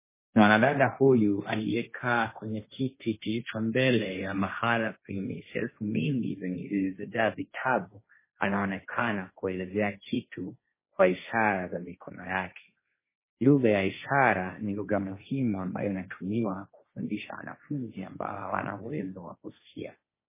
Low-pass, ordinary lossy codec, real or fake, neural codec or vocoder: 3.6 kHz; MP3, 16 kbps; fake; codec, 16 kHz, 1.1 kbps, Voila-Tokenizer